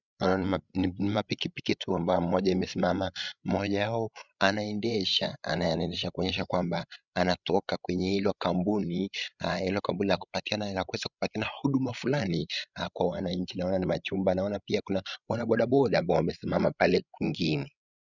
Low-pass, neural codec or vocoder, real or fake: 7.2 kHz; codec, 16 kHz, 16 kbps, FreqCodec, larger model; fake